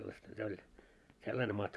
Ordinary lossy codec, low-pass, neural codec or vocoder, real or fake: none; none; vocoder, 24 kHz, 100 mel bands, Vocos; fake